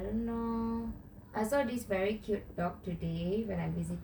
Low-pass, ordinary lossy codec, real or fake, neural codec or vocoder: none; none; real; none